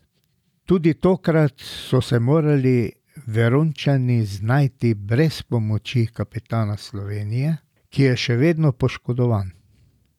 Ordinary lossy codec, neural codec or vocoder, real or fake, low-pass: none; none; real; 19.8 kHz